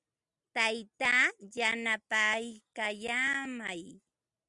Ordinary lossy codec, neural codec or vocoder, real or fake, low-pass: Opus, 64 kbps; none; real; 10.8 kHz